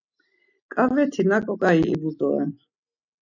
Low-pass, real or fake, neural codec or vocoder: 7.2 kHz; real; none